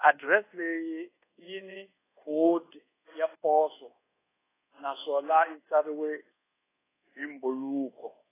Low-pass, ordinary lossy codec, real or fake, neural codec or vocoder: 3.6 kHz; AAC, 16 kbps; fake; codec, 24 kHz, 1.2 kbps, DualCodec